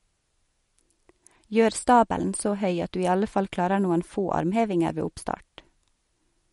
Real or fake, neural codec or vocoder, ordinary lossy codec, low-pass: real; none; MP3, 48 kbps; 14.4 kHz